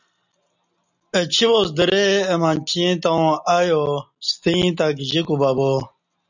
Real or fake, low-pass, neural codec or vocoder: real; 7.2 kHz; none